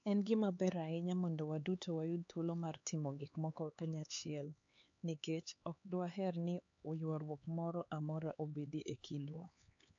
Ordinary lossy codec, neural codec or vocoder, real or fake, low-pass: AAC, 64 kbps; codec, 16 kHz, 4 kbps, X-Codec, HuBERT features, trained on LibriSpeech; fake; 7.2 kHz